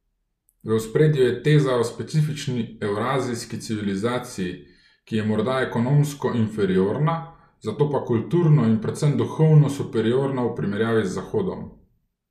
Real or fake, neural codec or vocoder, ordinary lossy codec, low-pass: real; none; none; 14.4 kHz